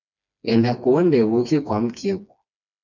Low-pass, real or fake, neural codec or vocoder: 7.2 kHz; fake; codec, 16 kHz, 2 kbps, FreqCodec, smaller model